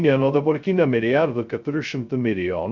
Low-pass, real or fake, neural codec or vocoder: 7.2 kHz; fake; codec, 16 kHz, 0.2 kbps, FocalCodec